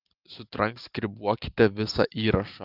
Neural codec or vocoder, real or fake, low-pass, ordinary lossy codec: none; real; 5.4 kHz; Opus, 32 kbps